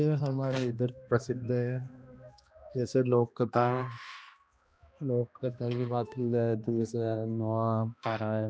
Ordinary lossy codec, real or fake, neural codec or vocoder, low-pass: none; fake; codec, 16 kHz, 1 kbps, X-Codec, HuBERT features, trained on balanced general audio; none